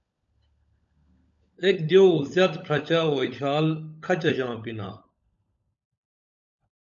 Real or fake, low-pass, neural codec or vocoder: fake; 7.2 kHz; codec, 16 kHz, 16 kbps, FunCodec, trained on LibriTTS, 50 frames a second